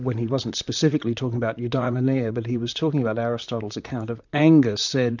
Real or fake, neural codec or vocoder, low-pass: fake; vocoder, 44.1 kHz, 128 mel bands, Pupu-Vocoder; 7.2 kHz